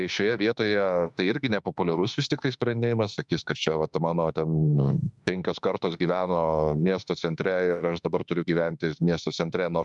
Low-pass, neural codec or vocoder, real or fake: 10.8 kHz; autoencoder, 48 kHz, 32 numbers a frame, DAC-VAE, trained on Japanese speech; fake